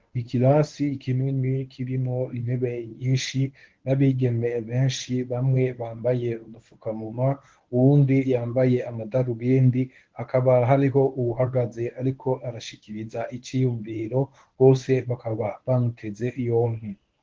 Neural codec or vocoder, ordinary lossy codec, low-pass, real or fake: codec, 24 kHz, 0.9 kbps, WavTokenizer, medium speech release version 1; Opus, 16 kbps; 7.2 kHz; fake